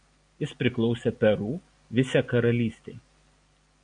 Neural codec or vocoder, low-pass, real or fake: none; 9.9 kHz; real